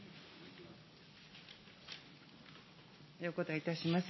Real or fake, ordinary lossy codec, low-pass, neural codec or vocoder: real; MP3, 24 kbps; 7.2 kHz; none